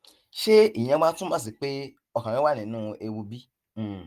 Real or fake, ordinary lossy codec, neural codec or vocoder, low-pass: real; Opus, 24 kbps; none; 14.4 kHz